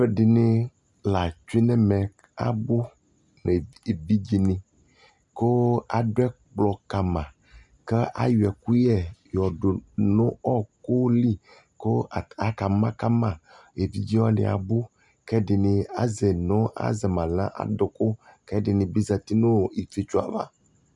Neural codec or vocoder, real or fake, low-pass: none; real; 10.8 kHz